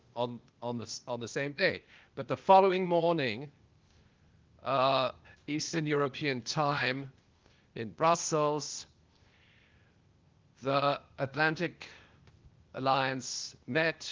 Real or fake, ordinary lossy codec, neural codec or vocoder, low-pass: fake; Opus, 32 kbps; codec, 16 kHz, 0.8 kbps, ZipCodec; 7.2 kHz